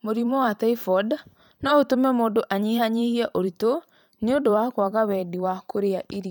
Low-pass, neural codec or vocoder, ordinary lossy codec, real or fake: none; vocoder, 44.1 kHz, 128 mel bands every 512 samples, BigVGAN v2; none; fake